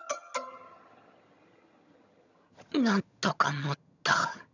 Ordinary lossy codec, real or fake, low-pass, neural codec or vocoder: none; fake; 7.2 kHz; vocoder, 22.05 kHz, 80 mel bands, HiFi-GAN